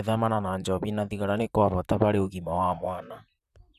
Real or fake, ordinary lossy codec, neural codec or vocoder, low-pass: fake; none; vocoder, 44.1 kHz, 128 mel bands, Pupu-Vocoder; 14.4 kHz